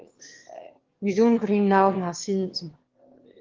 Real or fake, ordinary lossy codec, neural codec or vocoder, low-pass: fake; Opus, 16 kbps; autoencoder, 22.05 kHz, a latent of 192 numbers a frame, VITS, trained on one speaker; 7.2 kHz